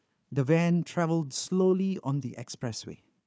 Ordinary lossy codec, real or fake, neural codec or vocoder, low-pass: none; fake; codec, 16 kHz, 4 kbps, FunCodec, trained on Chinese and English, 50 frames a second; none